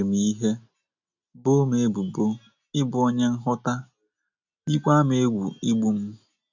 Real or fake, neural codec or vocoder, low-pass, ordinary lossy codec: real; none; 7.2 kHz; none